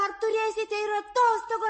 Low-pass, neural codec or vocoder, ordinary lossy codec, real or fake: 9.9 kHz; none; MP3, 32 kbps; real